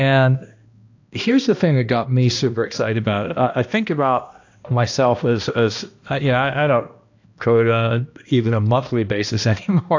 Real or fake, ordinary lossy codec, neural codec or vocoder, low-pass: fake; AAC, 48 kbps; codec, 16 kHz, 1 kbps, X-Codec, HuBERT features, trained on balanced general audio; 7.2 kHz